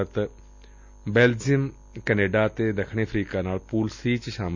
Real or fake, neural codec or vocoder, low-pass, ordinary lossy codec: real; none; 7.2 kHz; none